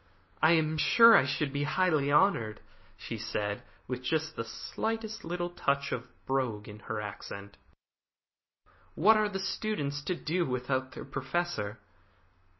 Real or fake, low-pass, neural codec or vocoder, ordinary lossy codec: real; 7.2 kHz; none; MP3, 24 kbps